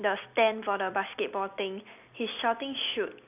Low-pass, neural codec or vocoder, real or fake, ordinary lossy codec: 3.6 kHz; none; real; none